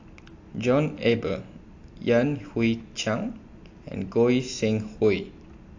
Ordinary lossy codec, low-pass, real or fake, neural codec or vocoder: AAC, 48 kbps; 7.2 kHz; real; none